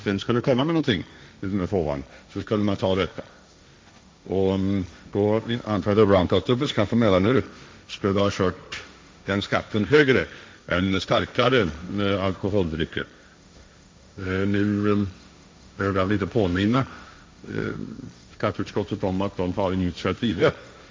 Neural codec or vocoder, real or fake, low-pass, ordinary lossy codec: codec, 16 kHz, 1.1 kbps, Voila-Tokenizer; fake; none; none